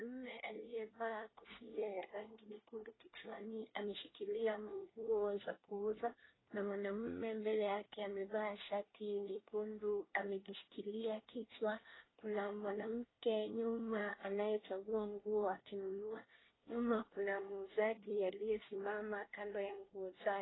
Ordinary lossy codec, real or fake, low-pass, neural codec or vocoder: AAC, 16 kbps; fake; 7.2 kHz; codec, 24 kHz, 1 kbps, SNAC